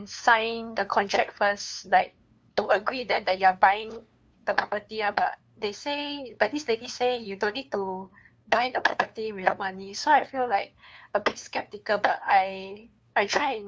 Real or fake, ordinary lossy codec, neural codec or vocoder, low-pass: fake; none; codec, 16 kHz, 2 kbps, FunCodec, trained on LibriTTS, 25 frames a second; none